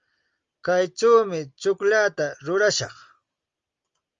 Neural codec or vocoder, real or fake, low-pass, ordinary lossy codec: none; real; 7.2 kHz; Opus, 32 kbps